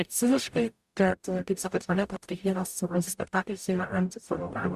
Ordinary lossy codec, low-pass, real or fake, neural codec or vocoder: Opus, 64 kbps; 14.4 kHz; fake; codec, 44.1 kHz, 0.9 kbps, DAC